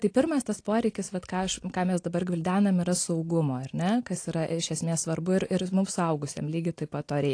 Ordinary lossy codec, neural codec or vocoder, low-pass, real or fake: AAC, 48 kbps; none; 9.9 kHz; real